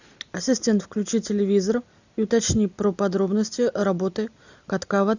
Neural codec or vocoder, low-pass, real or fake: none; 7.2 kHz; real